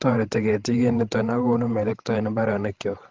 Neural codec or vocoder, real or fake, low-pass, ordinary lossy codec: codec, 16 kHz, 16 kbps, FreqCodec, larger model; fake; 7.2 kHz; Opus, 16 kbps